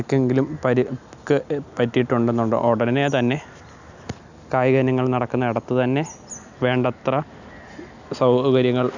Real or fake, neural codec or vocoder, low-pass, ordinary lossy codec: real; none; 7.2 kHz; none